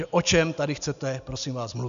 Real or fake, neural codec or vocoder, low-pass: real; none; 7.2 kHz